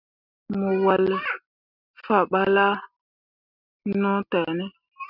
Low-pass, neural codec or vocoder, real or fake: 5.4 kHz; none; real